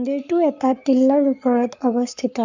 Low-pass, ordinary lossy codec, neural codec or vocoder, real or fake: 7.2 kHz; none; codec, 44.1 kHz, 7.8 kbps, Pupu-Codec; fake